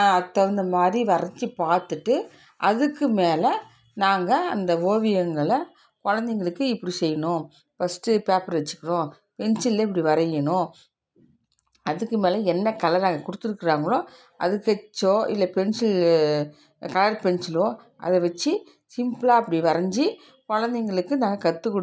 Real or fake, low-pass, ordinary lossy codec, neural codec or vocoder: real; none; none; none